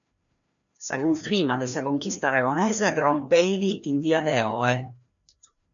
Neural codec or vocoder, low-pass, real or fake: codec, 16 kHz, 1 kbps, FreqCodec, larger model; 7.2 kHz; fake